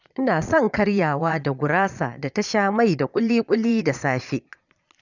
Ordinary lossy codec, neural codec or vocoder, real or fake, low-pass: none; vocoder, 22.05 kHz, 80 mel bands, Vocos; fake; 7.2 kHz